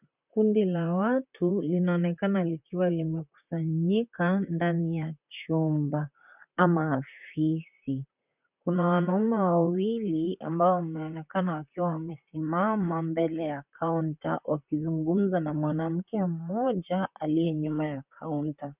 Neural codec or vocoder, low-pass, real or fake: vocoder, 44.1 kHz, 128 mel bands, Pupu-Vocoder; 3.6 kHz; fake